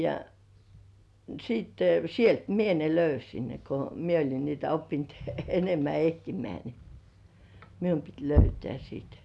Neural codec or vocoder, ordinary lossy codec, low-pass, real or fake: none; none; none; real